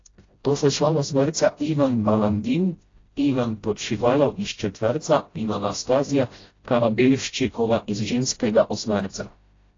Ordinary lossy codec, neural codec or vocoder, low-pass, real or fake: AAC, 32 kbps; codec, 16 kHz, 0.5 kbps, FreqCodec, smaller model; 7.2 kHz; fake